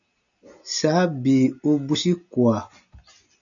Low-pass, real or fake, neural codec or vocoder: 7.2 kHz; real; none